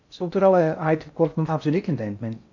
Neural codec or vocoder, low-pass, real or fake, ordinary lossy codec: codec, 16 kHz in and 24 kHz out, 0.6 kbps, FocalCodec, streaming, 4096 codes; 7.2 kHz; fake; AAC, 48 kbps